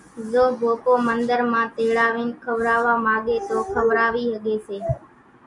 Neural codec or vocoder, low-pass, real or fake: none; 10.8 kHz; real